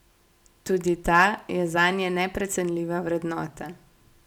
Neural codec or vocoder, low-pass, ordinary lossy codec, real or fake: none; 19.8 kHz; none; real